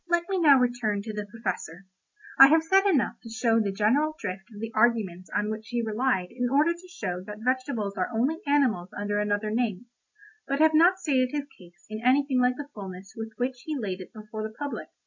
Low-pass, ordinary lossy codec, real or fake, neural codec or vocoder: 7.2 kHz; MP3, 48 kbps; real; none